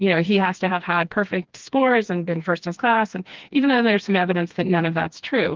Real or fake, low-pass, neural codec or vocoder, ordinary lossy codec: fake; 7.2 kHz; codec, 16 kHz, 2 kbps, FreqCodec, smaller model; Opus, 32 kbps